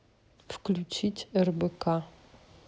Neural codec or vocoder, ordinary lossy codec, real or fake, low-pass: none; none; real; none